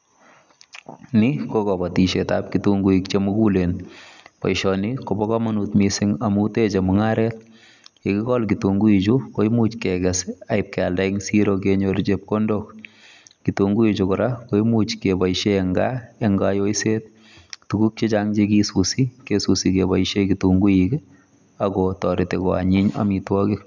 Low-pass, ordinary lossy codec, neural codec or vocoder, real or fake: 7.2 kHz; none; none; real